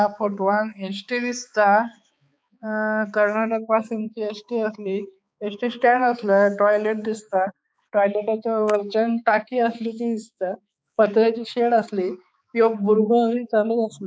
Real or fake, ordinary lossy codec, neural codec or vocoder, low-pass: fake; none; codec, 16 kHz, 4 kbps, X-Codec, HuBERT features, trained on balanced general audio; none